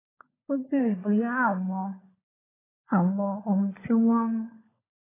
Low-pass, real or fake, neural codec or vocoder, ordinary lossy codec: 3.6 kHz; fake; codec, 16 kHz, 16 kbps, FunCodec, trained on LibriTTS, 50 frames a second; AAC, 16 kbps